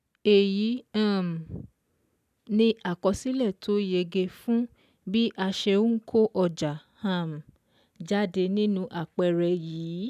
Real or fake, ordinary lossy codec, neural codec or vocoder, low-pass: real; none; none; 14.4 kHz